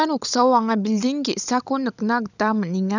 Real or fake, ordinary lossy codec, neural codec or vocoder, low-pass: fake; none; codec, 16 kHz, 16 kbps, FunCodec, trained on LibriTTS, 50 frames a second; 7.2 kHz